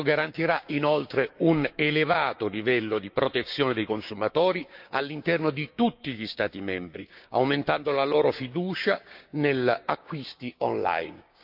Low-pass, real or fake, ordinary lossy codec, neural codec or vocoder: 5.4 kHz; fake; none; codec, 16 kHz, 6 kbps, DAC